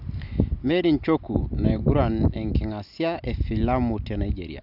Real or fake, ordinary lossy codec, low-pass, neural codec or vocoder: real; AAC, 48 kbps; 5.4 kHz; none